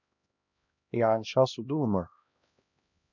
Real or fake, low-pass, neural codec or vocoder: fake; 7.2 kHz; codec, 16 kHz, 1 kbps, X-Codec, HuBERT features, trained on LibriSpeech